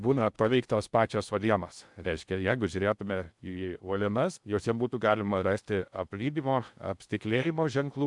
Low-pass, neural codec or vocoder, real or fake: 10.8 kHz; codec, 16 kHz in and 24 kHz out, 0.6 kbps, FocalCodec, streaming, 2048 codes; fake